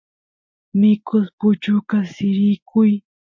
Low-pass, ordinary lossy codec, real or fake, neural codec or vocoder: 7.2 kHz; MP3, 48 kbps; real; none